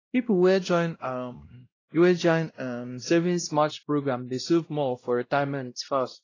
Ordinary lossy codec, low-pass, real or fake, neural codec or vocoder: AAC, 32 kbps; 7.2 kHz; fake; codec, 16 kHz, 0.5 kbps, X-Codec, WavLM features, trained on Multilingual LibriSpeech